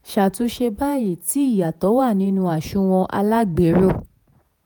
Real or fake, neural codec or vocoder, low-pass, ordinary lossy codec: fake; vocoder, 48 kHz, 128 mel bands, Vocos; none; none